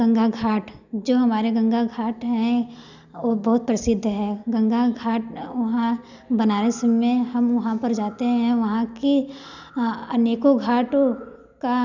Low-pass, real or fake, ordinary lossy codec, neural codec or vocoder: 7.2 kHz; real; none; none